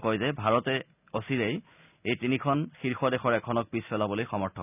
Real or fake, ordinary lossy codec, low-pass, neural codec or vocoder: real; none; 3.6 kHz; none